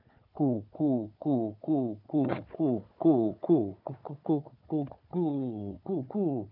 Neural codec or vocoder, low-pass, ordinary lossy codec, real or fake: codec, 16 kHz, 4 kbps, FunCodec, trained on Chinese and English, 50 frames a second; 5.4 kHz; none; fake